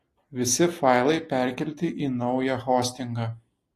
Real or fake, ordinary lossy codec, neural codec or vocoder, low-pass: real; AAC, 48 kbps; none; 14.4 kHz